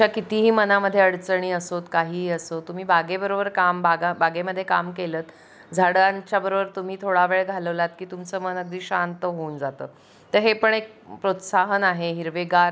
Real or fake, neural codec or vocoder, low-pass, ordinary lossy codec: real; none; none; none